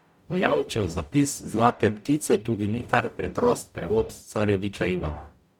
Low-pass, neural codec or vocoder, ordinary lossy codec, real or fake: 19.8 kHz; codec, 44.1 kHz, 0.9 kbps, DAC; none; fake